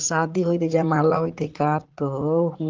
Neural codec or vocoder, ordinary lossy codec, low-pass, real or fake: vocoder, 44.1 kHz, 80 mel bands, Vocos; Opus, 16 kbps; 7.2 kHz; fake